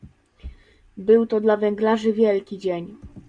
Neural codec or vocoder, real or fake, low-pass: none; real; 9.9 kHz